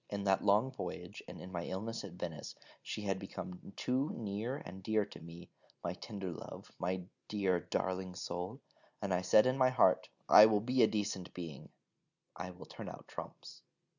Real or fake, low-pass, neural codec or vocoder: real; 7.2 kHz; none